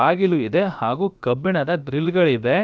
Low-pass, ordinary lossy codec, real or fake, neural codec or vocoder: none; none; fake; codec, 16 kHz, about 1 kbps, DyCAST, with the encoder's durations